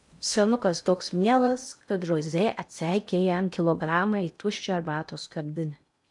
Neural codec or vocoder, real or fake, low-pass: codec, 16 kHz in and 24 kHz out, 0.6 kbps, FocalCodec, streaming, 2048 codes; fake; 10.8 kHz